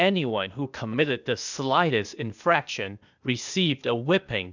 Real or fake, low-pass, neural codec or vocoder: fake; 7.2 kHz; codec, 16 kHz, 0.8 kbps, ZipCodec